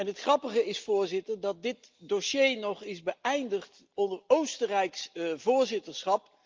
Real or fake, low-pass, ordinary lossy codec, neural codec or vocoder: real; 7.2 kHz; Opus, 32 kbps; none